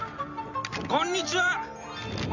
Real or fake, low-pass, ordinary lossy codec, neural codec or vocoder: real; 7.2 kHz; none; none